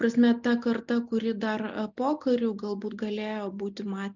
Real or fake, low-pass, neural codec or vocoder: real; 7.2 kHz; none